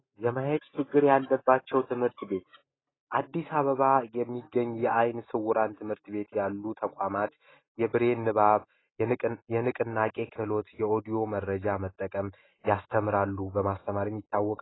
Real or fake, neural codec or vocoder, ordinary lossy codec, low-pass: real; none; AAC, 16 kbps; 7.2 kHz